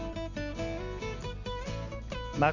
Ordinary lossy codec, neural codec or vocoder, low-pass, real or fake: none; none; 7.2 kHz; real